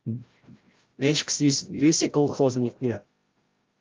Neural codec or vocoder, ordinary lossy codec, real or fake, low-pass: codec, 16 kHz, 0.5 kbps, FreqCodec, larger model; Opus, 32 kbps; fake; 7.2 kHz